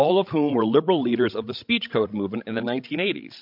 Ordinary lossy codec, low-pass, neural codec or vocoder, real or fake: MP3, 48 kbps; 5.4 kHz; codec, 16 kHz, 16 kbps, FreqCodec, larger model; fake